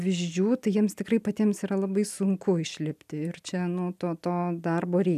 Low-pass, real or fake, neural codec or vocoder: 14.4 kHz; real; none